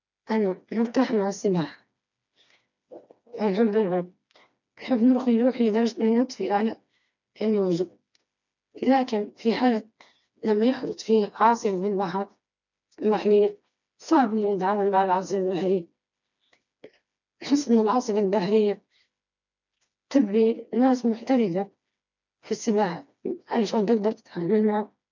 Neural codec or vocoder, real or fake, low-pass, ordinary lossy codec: codec, 16 kHz, 2 kbps, FreqCodec, smaller model; fake; 7.2 kHz; none